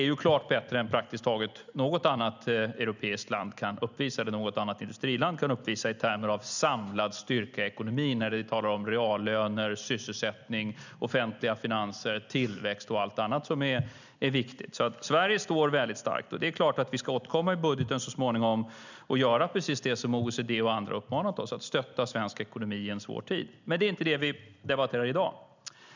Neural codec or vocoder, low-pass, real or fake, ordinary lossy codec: none; 7.2 kHz; real; none